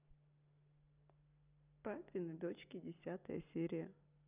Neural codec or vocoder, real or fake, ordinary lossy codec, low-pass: none; real; none; 3.6 kHz